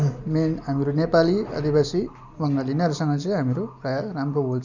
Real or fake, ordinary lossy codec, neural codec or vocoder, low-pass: real; none; none; 7.2 kHz